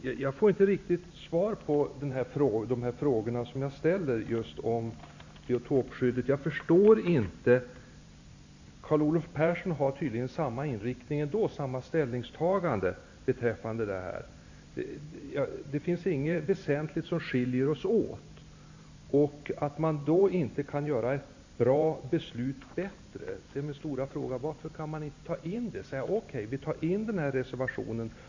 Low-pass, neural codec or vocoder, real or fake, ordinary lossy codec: 7.2 kHz; vocoder, 44.1 kHz, 128 mel bands every 512 samples, BigVGAN v2; fake; AAC, 48 kbps